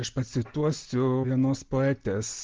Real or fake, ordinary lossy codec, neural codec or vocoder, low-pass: real; Opus, 16 kbps; none; 7.2 kHz